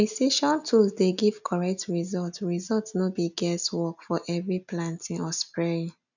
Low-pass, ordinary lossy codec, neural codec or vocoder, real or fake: 7.2 kHz; none; none; real